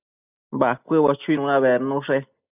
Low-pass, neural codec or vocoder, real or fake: 3.6 kHz; none; real